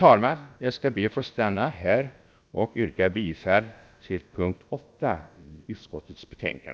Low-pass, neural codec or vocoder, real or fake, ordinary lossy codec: none; codec, 16 kHz, about 1 kbps, DyCAST, with the encoder's durations; fake; none